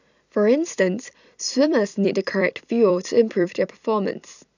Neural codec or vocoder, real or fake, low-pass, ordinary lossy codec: vocoder, 44.1 kHz, 128 mel bands every 256 samples, BigVGAN v2; fake; 7.2 kHz; none